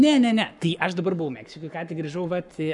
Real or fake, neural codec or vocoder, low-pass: fake; codec, 44.1 kHz, 7.8 kbps, Pupu-Codec; 10.8 kHz